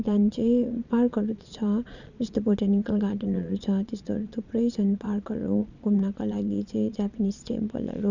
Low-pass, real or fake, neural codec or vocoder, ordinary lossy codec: 7.2 kHz; fake; vocoder, 22.05 kHz, 80 mel bands, Vocos; none